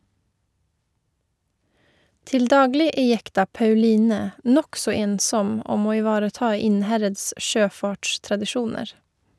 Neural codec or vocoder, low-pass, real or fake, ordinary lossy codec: none; none; real; none